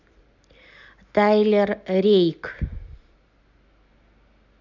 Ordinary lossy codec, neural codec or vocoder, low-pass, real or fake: none; none; 7.2 kHz; real